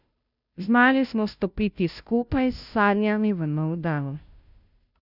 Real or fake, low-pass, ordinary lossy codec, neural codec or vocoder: fake; 5.4 kHz; none; codec, 16 kHz, 0.5 kbps, FunCodec, trained on Chinese and English, 25 frames a second